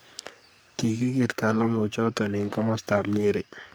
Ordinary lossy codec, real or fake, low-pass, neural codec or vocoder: none; fake; none; codec, 44.1 kHz, 3.4 kbps, Pupu-Codec